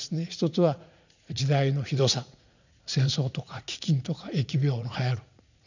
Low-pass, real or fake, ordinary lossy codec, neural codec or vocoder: 7.2 kHz; fake; none; vocoder, 44.1 kHz, 80 mel bands, Vocos